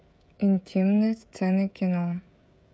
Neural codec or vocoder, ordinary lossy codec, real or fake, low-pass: codec, 16 kHz, 16 kbps, FreqCodec, smaller model; none; fake; none